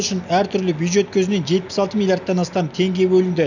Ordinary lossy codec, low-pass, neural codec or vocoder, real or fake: none; 7.2 kHz; none; real